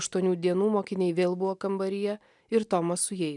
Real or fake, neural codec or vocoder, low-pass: real; none; 10.8 kHz